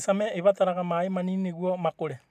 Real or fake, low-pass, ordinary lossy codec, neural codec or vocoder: fake; 14.4 kHz; MP3, 96 kbps; vocoder, 44.1 kHz, 128 mel bands every 256 samples, BigVGAN v2